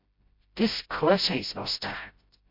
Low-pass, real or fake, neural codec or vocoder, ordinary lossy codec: 5.4 kHz; fake; codec, 16 kHz, 0.5 kbps, FreqCodec, smaller model; MP3, 32 kbps